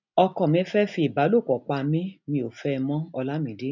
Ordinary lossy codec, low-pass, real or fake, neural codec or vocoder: none; 7.2 kHz; real; none